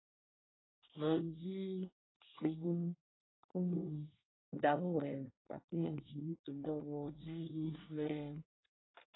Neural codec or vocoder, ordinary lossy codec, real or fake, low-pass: codec, 24 kHz, 1 kbps, SNAC; AAC, 16 kbps; fake; 7.2 kHz